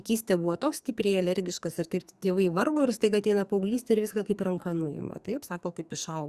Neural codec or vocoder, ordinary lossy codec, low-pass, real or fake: codec, 44.1 kHz, 2.6 kbps, SNAC; Opus, 64 kbps; 14.4 kHz; fake